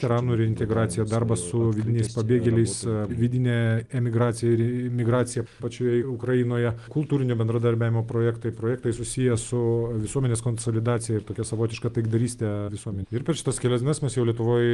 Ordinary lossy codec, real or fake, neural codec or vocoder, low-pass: Opus, 32 kbps; real; none; 10.8 kHz